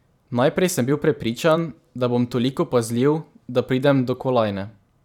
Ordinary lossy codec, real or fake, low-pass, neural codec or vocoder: none; fake; 19.8 kHz; vocoder, 44.1 kHz, 128 mel bands every 512 samples, BigVGAN v2